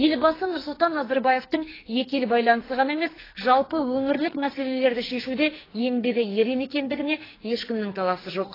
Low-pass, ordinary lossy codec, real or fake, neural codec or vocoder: 5.4 kHz; AAC, 24 kbps; fake; codec, 44.1 kHz, 2.6 kbps, SNAC